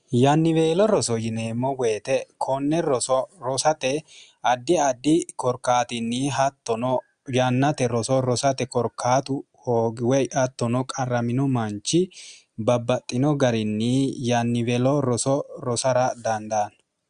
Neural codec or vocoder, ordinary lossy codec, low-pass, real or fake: none; Opus, 64 kbps; 9.9 kHz; real